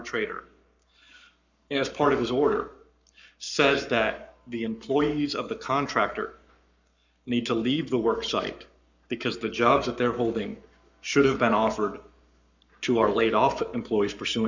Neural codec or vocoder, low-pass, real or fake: codec, 44.1 kHz, 7.8 kbps, Pupu-Codec; 7.2 kHz; fake